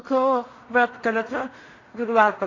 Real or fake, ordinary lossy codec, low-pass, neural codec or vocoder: fake; AAC, 32 kbps; 7.2 kHz; codec, 16 kHz in and 24 kHz out, 0.4 kbps, LongCat-Audio-Codec, two codebook decoder